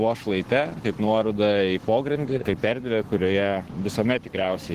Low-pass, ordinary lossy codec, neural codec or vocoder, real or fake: 14.4 kHz; Opus, 16 kbps; codec, 44.1 kHz, 7.8 kbps, Pupu-Codec; fake